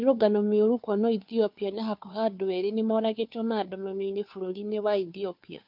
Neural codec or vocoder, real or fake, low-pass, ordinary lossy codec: codec, 16 kHz, 2 kbps, FunCodec, trained on Chinese and English, 25 frames a second; fake; 5.4 kHz; MP3, 48 kbps